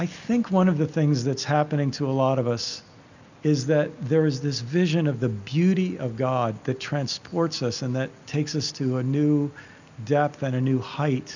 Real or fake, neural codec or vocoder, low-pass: real; none; 7.2 kHz